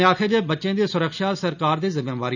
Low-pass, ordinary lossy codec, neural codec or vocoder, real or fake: 7.2 kHz; none; none; real